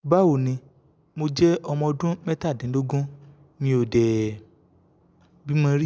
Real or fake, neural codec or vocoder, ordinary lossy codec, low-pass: real; none; none; none